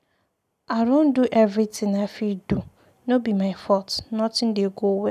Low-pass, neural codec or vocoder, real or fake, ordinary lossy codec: 14.4 kHz; none; real; none